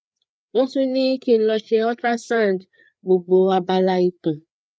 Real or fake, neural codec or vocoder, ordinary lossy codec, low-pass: fake; codec, 16 kHz, 4 kbps, FreqCodec, larger model; none; none